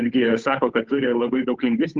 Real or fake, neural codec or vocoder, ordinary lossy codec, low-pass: fake; codec, 16 kHz, 8 kbps, FunCodec, trained on Chinese and English, 25 frames a second; Opus, 32 kbps; 7.2 kHz